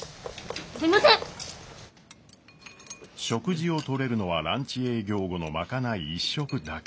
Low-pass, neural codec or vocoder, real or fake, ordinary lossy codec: none; none; real; none